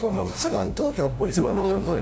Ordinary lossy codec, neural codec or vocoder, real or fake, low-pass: none; codec, 16 kHz, 0.5 kbps, FunCodec, trained on LibriTTS, 25 frames a second; fake; none